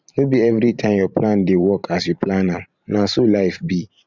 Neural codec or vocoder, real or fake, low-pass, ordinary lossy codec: vocoder, 44.1 kHz, 128 mel bands every 512 samples, BigVGAN v2; fake; 7.2 kHz; none